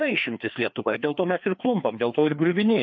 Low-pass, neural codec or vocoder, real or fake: 7.2 kHz; codec, 16 kHz, 2 kbps, FreqCodec, larger model; fake